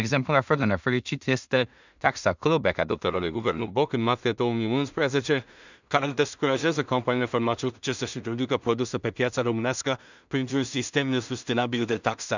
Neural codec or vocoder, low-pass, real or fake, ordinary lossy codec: codec, 16 kHz in and 24 kHz out, 0.4 kbps, LongCat-Audio-Codec, two codebook decoder; 7.2 kHz; fake; none